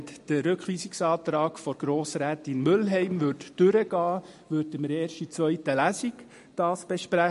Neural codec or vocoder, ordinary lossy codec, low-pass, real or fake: none; MP3, 48 kbps; 14.4 kHz; real